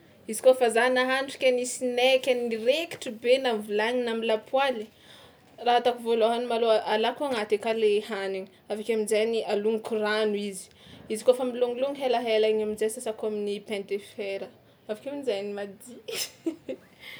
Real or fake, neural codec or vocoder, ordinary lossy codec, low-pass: real; none; none; none